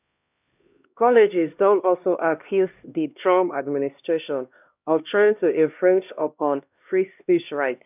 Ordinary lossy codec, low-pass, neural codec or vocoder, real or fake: none; 3.6 kHz; codec, 16 kHz, 1 kbps, X-Codec, HuBERT features, trained on LibriSpeech; fake